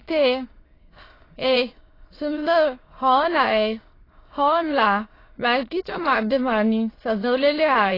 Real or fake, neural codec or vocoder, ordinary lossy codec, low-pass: fake; autoencoder, 22.05 kHz, a latent of 192 numbers a frame, VITS, trained on many speakers; AAC, 24 kbps; 5.4 kHz